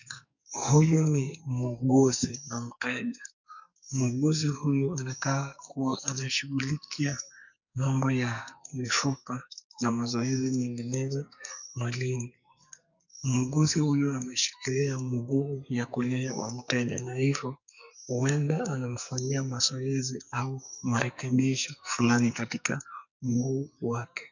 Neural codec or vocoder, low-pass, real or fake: codec, 44.1 kHz, 2.6 kbps, SNAC; 7.2 kHz; fake